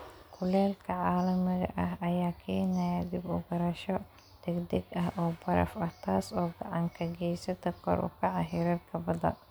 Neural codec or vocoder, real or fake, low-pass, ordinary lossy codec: none; real; none; none